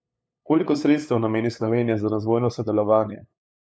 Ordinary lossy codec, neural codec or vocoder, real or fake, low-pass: none; codec, 16 kHz, 8 kbps, FunCodec, trained on LibriTTS, 25 frames a second; fake; none